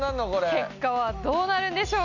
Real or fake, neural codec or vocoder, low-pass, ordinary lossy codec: real; none; 7.2 kHz; none